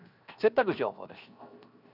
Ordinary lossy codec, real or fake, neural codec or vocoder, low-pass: none; fake; codec, 16 kHz, 0.7 kbps, FocalCodec; 5.4 kHz